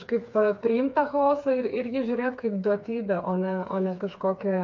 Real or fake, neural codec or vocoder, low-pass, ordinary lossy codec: fake; codec, 16 kHz, 4 kbps, FreqCodec, smaller model; 7.2 kHz; MP3, 48 kbps